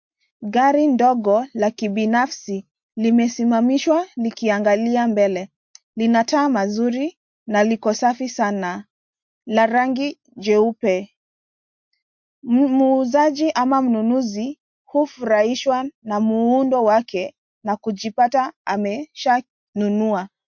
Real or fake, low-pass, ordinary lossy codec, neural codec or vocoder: real; 7.2 kHz; MP3, 48 kbps; none